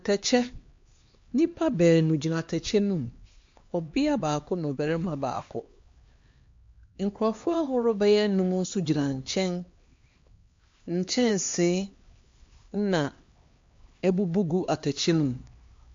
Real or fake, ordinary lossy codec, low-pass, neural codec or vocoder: fake; MP3, 48 kbps; 7.2 kHz; codec, 16 kHz, 2 kbps, X-Codec, HuBERT features, trained on LibriSpeech